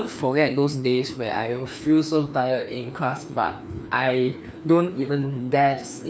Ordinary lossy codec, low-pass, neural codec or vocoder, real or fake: none; none; codec, 16 kHz, 2 kbps, FreqCodec, larger model; fake